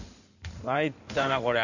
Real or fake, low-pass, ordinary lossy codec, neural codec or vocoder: fake; 7.2 kHz; none; codec, 16 kHz in and 24 kHz out, 2.2 kbps, FireRedTTS-2 codec